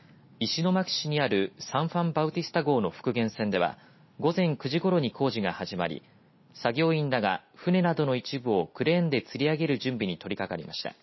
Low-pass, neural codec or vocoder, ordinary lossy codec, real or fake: 7.2 kHz; none; MP3, 24 kbps; real